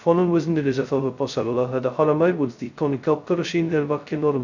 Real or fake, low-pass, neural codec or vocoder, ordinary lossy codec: fake; 7.2 kHz; codec, 16 kHz, 0.2 kbps, FocalCodec; none